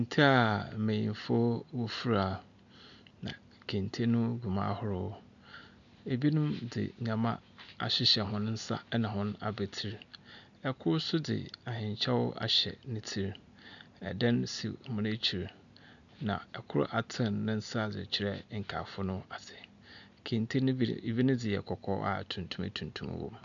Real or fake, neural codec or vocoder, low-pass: real; none; 7.2 kHz